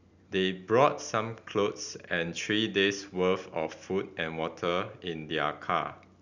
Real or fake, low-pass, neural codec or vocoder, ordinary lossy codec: real; 7.2 kHz; none; Opus, 64 kbps